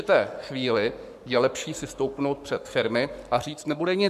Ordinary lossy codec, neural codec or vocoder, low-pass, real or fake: MP3, 96 kbps; codec, 44.1 kHz, 7.8 kbps, Pupu-Codec; 14.4 kHz; fake